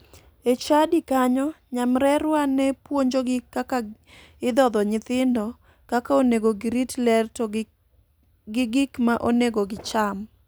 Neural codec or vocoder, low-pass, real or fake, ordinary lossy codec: vocoder, 44.1 kHz, 128 mel bands every 256 samples, BigVGAN v2; none; fake; none